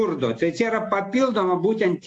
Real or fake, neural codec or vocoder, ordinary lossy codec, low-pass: real; none; AAC, 48 kbps; 9.9 kHz